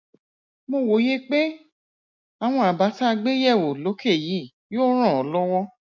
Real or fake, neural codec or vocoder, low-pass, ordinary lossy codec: real; none; 7.2 kHz; MP3, 64 kbps